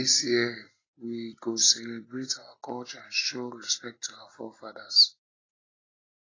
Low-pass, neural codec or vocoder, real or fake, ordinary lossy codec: 7.2 kHz; none; real; AAC, 32 kbps